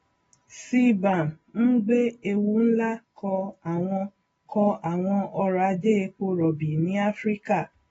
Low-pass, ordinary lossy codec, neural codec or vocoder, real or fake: 10.8 kHz; AAC, 24 kbps; none; real